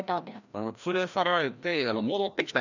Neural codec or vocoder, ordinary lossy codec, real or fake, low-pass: codec, 16 kHz, 1 kbps, FreqCodec, larger model; none; fake; 7.2 kHz